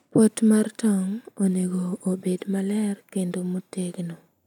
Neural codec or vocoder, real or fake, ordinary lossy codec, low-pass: none; real; none; 19.8 kHz